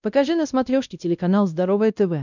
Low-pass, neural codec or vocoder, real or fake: 7.2 kHz; codec, 16 kHz, 1 kbps, X-Codec, WavLM features, trained on Multilingual LibriSpeech; fake